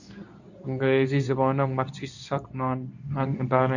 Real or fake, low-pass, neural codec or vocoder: fake; 7.2 kHz; codec, 24 kHz, 0.9 kbps, WavTokenizer, medium speech release version 2